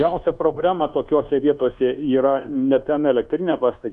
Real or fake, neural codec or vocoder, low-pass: fake; codec, 24 kHz, 1.2 kbps, DualCodec; 10.8 kHz